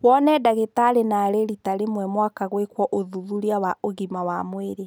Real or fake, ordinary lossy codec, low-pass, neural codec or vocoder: real; none; none; none